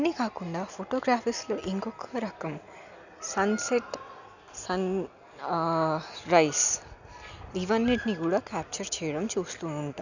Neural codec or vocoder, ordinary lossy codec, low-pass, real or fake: none; none; 7.2 kHz; real